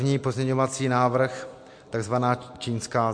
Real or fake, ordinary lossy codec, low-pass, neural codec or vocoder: real; MP3, 48 kbps; 9.9 kHz; none